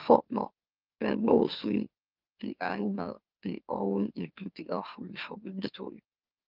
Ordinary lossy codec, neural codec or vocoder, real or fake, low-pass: Opus, 32 kbps; autoencoder, 44.1 kHz, a latent of 192 numbers a frame, MeloTTS; fake; 5.4 kHz